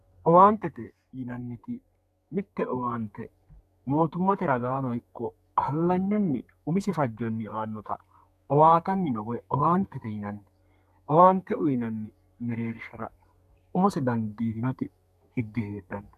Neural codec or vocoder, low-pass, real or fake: codec, 32 kHz, 1.9 kbps, SNAC; 14.4 kHz; fake